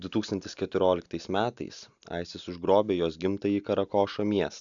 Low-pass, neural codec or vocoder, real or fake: 7.2 kHz; none; real